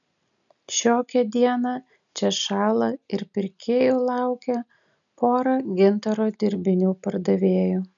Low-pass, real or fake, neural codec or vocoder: 7.2 kHz; real; none